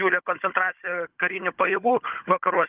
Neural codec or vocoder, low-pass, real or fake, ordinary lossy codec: codec, 16 kHz, 16 kbps, FunCodec, trained on Chinese and English, 50 frames a second; 3.6 kHz; fake; Opus, 24 kbps